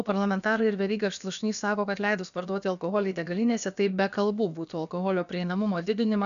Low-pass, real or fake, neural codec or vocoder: 7.2 kHz; fake; codec, 16 kHz, about 1 kbps, DyCAST, with the encoder's durations